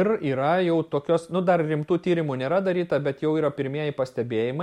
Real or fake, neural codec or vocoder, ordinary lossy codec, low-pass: real; none; MP3, 64 kbps; 19.8 kHz